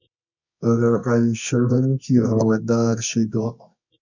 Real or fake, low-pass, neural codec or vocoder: fake; 7.2 kHz; codec, 24 kHz, 0.9 kbps, WavTokenizer, medium music audio release